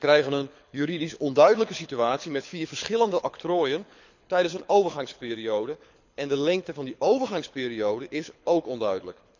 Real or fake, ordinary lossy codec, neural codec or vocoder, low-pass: fake; none; codec, 24 kHz, 6 kbps, HILCodec; 7.2 kHz